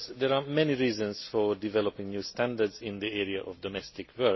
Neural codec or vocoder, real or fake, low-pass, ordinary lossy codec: none; real; 7.2 kHz; MP3, 24 kbps